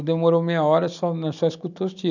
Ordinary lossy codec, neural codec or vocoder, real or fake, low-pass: none; none; real; 7.2 kHz